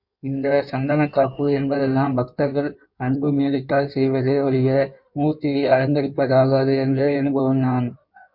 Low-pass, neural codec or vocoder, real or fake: 5.4 kHz; codec, 16 kHz in and 24 kHz out, 1.1 kbps, FireRedTTS-2 codec; fake